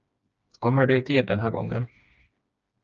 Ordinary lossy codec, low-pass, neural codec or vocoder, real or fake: Opus, 24 kbps; 7.2 kHz; codec, 16 kHz, 2 kbps, FreqCodec, smaller model; fake